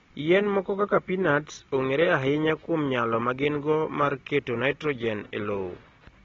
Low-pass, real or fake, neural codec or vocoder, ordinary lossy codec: 7.2 kHz; real; none; AAC, 24 kbps